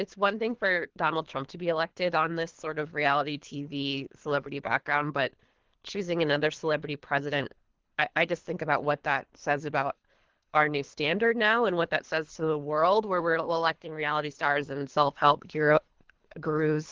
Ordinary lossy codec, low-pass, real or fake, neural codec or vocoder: Opus, 16 kbps; 7.2 kHz; fake; codec, 24 kHz, 3 kbps, HILCodec